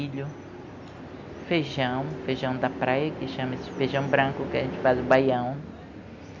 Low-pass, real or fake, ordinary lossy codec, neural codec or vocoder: 7.2 kHz; real; Opus, 64 kbps; none